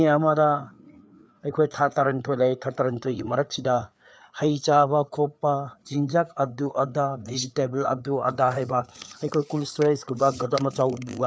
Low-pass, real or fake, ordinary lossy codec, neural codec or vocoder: none; fake; none; codec, 16 kHz, 4 kbps, FreqCodec, larger model